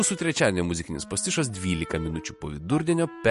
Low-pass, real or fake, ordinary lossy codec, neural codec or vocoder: 14.4 kHz; real; MP3, 48 kbps; none